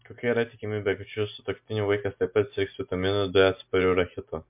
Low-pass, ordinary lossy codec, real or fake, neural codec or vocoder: 3.6 kHz; MP3, 32 kbps; real; none